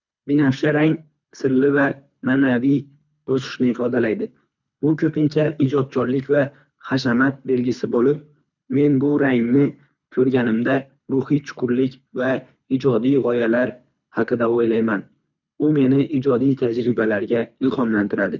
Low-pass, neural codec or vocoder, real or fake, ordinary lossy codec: 7.2 kHz; codec, 24 kHz, 3 kbps, HILCodec; fake; none